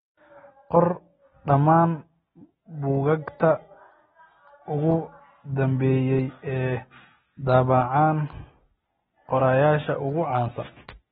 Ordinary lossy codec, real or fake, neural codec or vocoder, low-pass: AAC, 16 kbps; real; none; 7.2 kHz